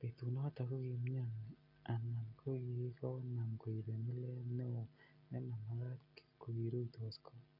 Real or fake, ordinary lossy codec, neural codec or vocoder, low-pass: real; AAC, 48 kbps; none; 5.4 kHz